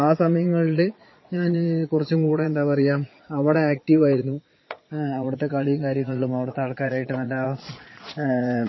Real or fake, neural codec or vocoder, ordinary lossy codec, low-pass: fake; vocoder, 22.05 kHz, 80 mel bands, Vocos; MP3, 24 kbps; 7.2 kHz